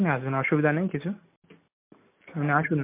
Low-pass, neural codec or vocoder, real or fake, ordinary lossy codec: 3.6 kHz; none; real; MP3, 32 kbps